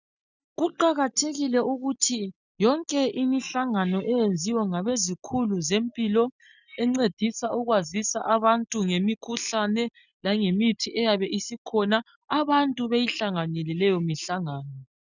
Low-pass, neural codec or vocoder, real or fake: 7.2 kHz; none; real